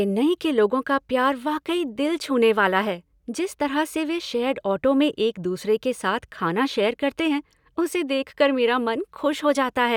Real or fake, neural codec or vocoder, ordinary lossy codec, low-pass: real; none; none; 19.8 kHz